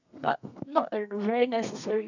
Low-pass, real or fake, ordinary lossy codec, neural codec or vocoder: 7.2 kHz; fake; none; codec, 32 kHz, 1.9 kbps, SNAC